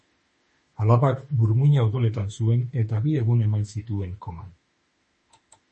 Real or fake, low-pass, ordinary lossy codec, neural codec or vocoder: fake; 10.8 kHz; MP3, 32 kbps; autoencoder, 48 kHz, 32 numbers a frame, DAC-VAE, trained on Japanese speech